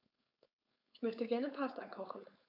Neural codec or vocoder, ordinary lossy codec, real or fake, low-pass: codec, 16 kHz, 4.8 kbps, FACodec; AAC, 48 kbps; fake; 5.4 kHz